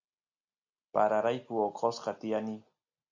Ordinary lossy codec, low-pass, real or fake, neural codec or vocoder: AAC, 48 kbps; 7.2 kHz; real; none